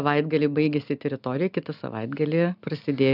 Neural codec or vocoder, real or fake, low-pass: none; real; 5.4 kHz